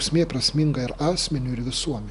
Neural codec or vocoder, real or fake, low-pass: none; real; 10.8 kHz